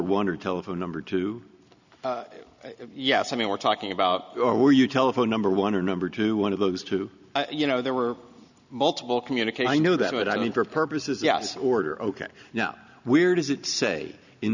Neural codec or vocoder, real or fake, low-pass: none; real; 7.2 kHz